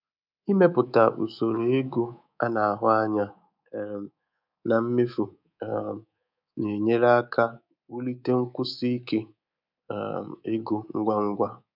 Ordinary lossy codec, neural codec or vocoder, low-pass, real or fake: none; codec, 24 kHz, 3.1 kbps, DualCodec; 5.4 kHz; fake